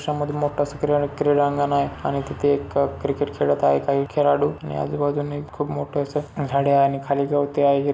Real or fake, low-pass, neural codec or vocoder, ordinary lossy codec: real; none; none; none